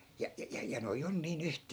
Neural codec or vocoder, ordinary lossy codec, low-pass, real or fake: vocoder, 44.1 kHz, 128 mel bands every 256 samples, BigVGAN v2; none; none; fake